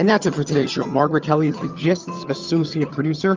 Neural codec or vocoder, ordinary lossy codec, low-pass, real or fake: vocoder, 22.05 kHz, 80 mel bands, HiFi-GAN; Opus, 32 kbps; 7.2 kHz; fake